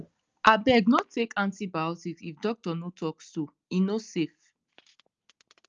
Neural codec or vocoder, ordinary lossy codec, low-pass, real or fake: none; Opus, 24 kbps; 7.2 kHz; real